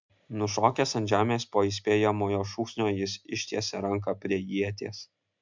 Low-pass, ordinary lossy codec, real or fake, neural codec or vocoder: 7.2 kHz; MP3, 64 kbps; real; none